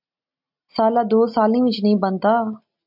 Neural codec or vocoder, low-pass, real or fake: none; 5.4 kHz; real